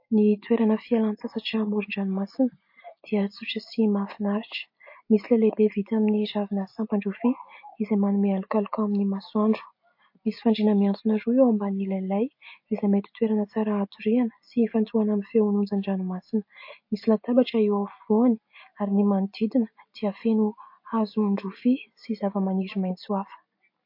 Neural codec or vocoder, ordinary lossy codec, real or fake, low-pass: none; MP3, 32 kbps; real; 5.4 kHz